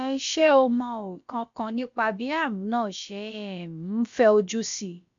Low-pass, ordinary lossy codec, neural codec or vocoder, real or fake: 7.2 kHz; MP3, 64 kbps; codec, 16 kHz, about 1 kbps, DyCAST, with the encoder's durations; fake